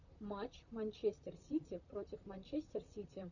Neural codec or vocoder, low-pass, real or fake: vocoder, 44.1 kHz, 128 mel bands, Pupu-Vocoder; 7.2 kHz; fake